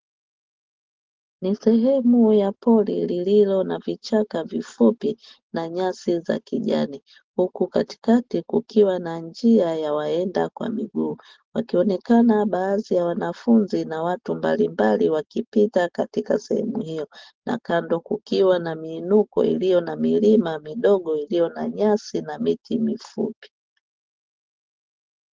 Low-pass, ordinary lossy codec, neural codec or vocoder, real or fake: 7.2 kHz; Opus, 16 kbps; none; real